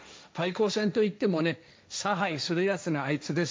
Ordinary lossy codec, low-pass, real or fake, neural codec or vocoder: none; none; fake; codec, 16 kHz, 1.1 kbps, Voila-Tokenizer